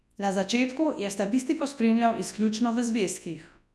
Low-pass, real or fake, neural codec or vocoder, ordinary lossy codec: none; fake; codec, 24 kHz, 0.9 kbps, WavTokenizer, large speech release; none